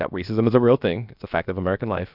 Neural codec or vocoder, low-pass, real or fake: codec, 16 kHz, about 1 kbps, DyCAST, with the encoder's durations; 5.4 kHz; fake